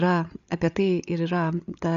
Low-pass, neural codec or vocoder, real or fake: 7.2 kHz; codec, 16 kHz, 8 kbps, FreqCodec, larger model; fake